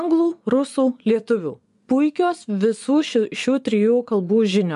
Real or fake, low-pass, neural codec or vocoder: fake; 10.8 kHz; vocoder, 24 kHz, 100 mel bands, Vocos